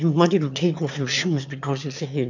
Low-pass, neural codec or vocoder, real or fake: 7.2 kHz; autoencoder, 22.05 kHz, a latent of 192 numbers a frame, VITS, trained on one speaker; fake